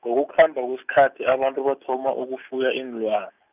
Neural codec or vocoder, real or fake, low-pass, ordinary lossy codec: none; real; 3.6 kHz; none